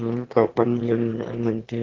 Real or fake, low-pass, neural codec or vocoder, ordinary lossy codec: fake; 7.2 kHz; autoencoder, 22.05 kHz, a latent of 192 numbers a frame, VITS, trained on one speaker; Opus, 16 kbps